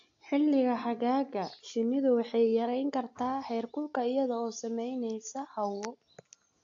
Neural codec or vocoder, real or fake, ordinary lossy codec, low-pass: none; real; AAC, 48 kbps; 7.2 kHz